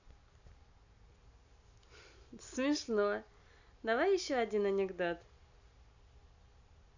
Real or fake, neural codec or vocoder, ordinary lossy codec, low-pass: real; none; none; 7.2 kHz